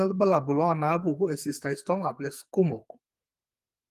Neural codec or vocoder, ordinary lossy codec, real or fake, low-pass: codec, 44.1 kHz, 2.6 kbps, SNAC; Opus, 32 kbps; fake; 14.4 kHz